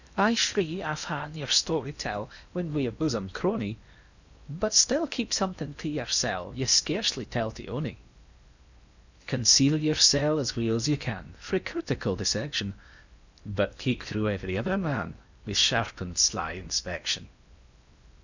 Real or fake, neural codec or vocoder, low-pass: fake; codec, 16 kHz in and 24 kHz out, 0.8 kbps, FocalCodec, streaming, 65536 codes; 7.2 kHz